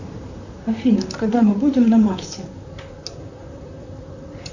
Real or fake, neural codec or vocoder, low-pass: fake; vocoder, 44.1 kHz, 128 mel bands, Pupu-Vocoder; 7.2 kHz